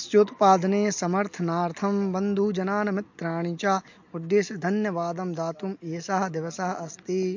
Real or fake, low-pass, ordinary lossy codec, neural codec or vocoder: real; 7.2 kHz; MP3, 48 kbps; none